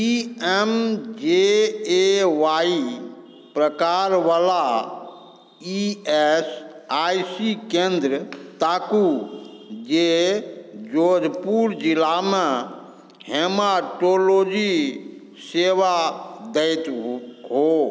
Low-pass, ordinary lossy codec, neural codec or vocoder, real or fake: none; none; none; real